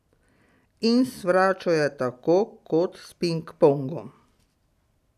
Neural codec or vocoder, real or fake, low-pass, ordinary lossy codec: none; real; 14.4 kHz; none